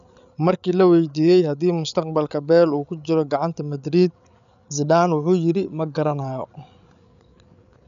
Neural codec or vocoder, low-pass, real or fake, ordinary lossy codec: codec, 16 kHz, 8 kbps, FreqCodec, larger model; 7.2 kHz; fake; none